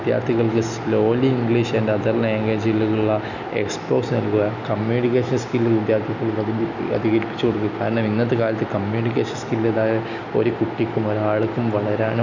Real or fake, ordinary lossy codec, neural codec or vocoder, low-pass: real; none; none; 7.2 kHz